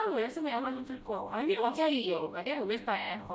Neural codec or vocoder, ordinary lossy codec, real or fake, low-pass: codec, 16 kHz, 0.5 kbps, FreqCodec, smaller model; none; fake; none